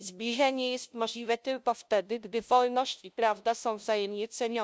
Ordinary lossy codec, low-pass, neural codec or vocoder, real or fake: none; none; codec, 16 kHz, 0.5 kbps, FunCodec, trained on LibriTTS, 25 frames a second; fake